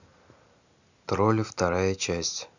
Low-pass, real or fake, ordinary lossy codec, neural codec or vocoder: 7.2 kHz; real; none; none